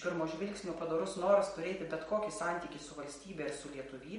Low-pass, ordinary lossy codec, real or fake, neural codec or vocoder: 10.8 kHz; MP3, 48 kbps; real; none